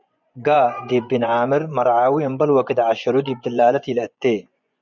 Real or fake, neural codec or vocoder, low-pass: fake; vocoder, 24 kHz, 100 mel bands, Vocos; 7.2 kHz